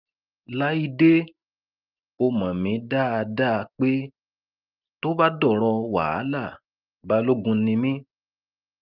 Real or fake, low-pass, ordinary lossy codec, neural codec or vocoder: real; 5.4 kHz; Opus, 32 kbps; none